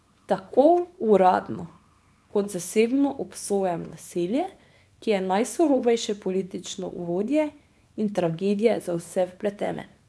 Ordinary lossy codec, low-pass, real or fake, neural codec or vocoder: none; none; fake; codec, 24 kHz, 0.9 kbps, WavTokenizer, small release